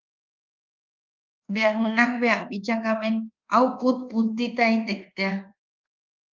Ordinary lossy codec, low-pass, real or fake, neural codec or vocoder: Opus, 32 kbps; 7.2 kHz; fake; codec, 24 kHz, 1.2 kbps, DualCodec